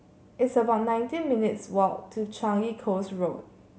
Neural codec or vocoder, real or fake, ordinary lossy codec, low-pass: none; real; none; none